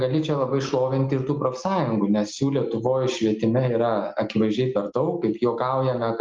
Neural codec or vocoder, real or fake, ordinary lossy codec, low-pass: none; real; Opus, 32 kbps; 7.2 kHz